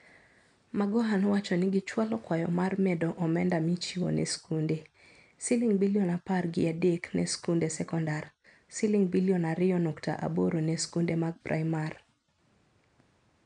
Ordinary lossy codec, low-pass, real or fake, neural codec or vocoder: none; 9.9 kHz; real; none